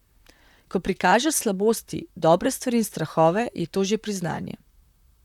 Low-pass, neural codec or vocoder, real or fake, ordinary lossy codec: 19.8 kHz; vocoder, 44.1 kHz, 128 mel bands, Pupu-Vocoder; fake; none